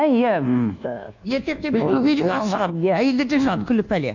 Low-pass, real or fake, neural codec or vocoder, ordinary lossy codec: 7.2 kHz; fake; codec, 24 kHz, 1.2 kbps, DualCodec; none